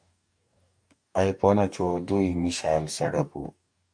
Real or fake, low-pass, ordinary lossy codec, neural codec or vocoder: fake; 9.9 kHz; MP3, 64 kbps; codec, 44.1 kHz, 2.6 kbps, DAC